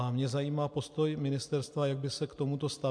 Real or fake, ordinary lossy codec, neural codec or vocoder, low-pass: real; MP3, 64 kbps; none; 9.9 kHz